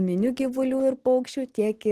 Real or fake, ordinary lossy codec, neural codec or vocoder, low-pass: real; Opus, 16 kbps; none; 14.4 kHz